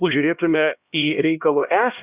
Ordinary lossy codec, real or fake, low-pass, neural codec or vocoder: Opus, 64 kbps; fake; 3.6 kHz; codec, 16 kHz, 1 kbps, X-Codec, HuBERT features, trained on LibriSpeech